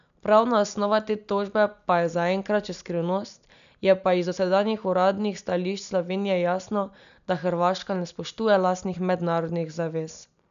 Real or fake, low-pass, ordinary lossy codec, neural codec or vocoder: real; 7.2 kHz; none; none